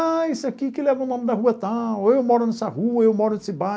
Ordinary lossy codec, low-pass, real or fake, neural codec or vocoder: none; none; real; none